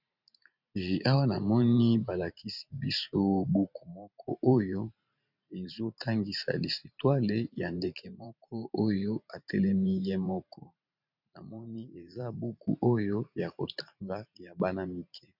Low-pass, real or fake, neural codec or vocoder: 5.4 kHz; fake; vocoder, 44.1 kHz, 128 mel bands every 512 samples, BigVGAN v2